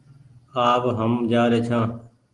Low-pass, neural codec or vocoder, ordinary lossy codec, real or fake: 10.8 kHz; none; Opus, 24 kbps; real